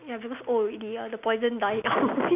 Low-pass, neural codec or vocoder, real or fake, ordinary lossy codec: 3.6 kHz; none; real; none